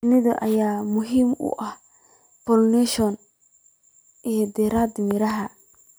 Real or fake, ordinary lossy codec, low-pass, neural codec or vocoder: real; none; none; none